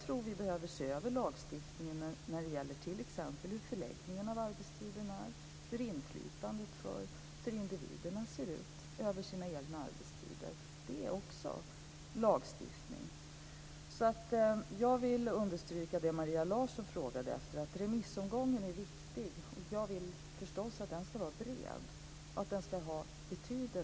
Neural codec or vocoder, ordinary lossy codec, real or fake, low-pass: none; none; real; none